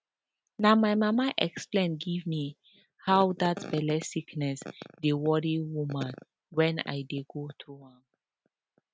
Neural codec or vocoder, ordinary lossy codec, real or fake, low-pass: none; none; real; none